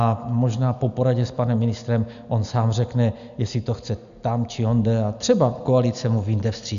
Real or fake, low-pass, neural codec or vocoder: real; 7.2 kHz; none